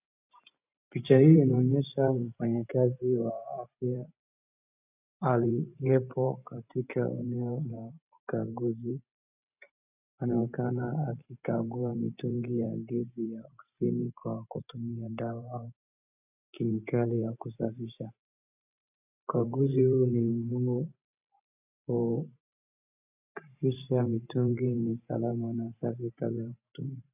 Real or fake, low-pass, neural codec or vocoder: fake; 3.6 kHz; vocoder, 44.1 kHz, 128 mel bands every 512 samples, BigVGAN v2